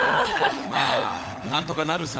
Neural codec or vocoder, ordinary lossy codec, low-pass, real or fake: codec, 16 kHz, 16 kbps, FunCodec, trained on LibriTTS, 50 frames a second; none; none; fake